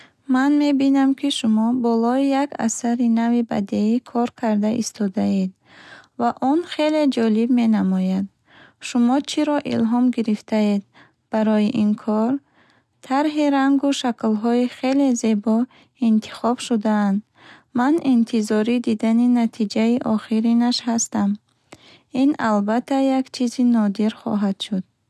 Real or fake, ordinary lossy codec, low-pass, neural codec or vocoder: real; none; none; none